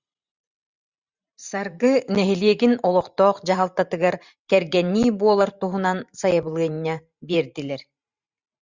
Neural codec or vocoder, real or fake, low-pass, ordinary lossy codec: vocoder, 44.1 kHz, 128 mel bands every 512 samples, BigVGAN v2; fake; 7.2 kHz; Opus, 64 kbps